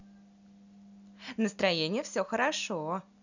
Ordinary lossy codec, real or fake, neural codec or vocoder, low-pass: none; real; none; 7.2 kHz